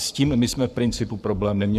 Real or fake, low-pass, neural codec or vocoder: fake; 14.4 kHz; codec, 44.1 kHz, 7.8 kbps, Pupu-Codec